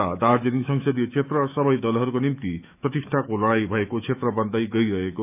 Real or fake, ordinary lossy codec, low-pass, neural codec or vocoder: fake; none; 3.6 kHz; autoencoder, 48 kHz, 128 numbers a frame, DAC-VAE, trained on Japanese speech